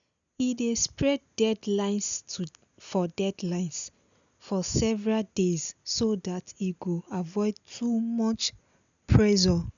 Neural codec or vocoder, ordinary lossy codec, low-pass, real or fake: none; none; 7.2 kHz; real